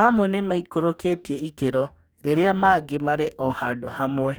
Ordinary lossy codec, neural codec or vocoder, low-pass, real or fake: none; codec, 44.1 kHz, 2.6 kbps, DAC; none; fake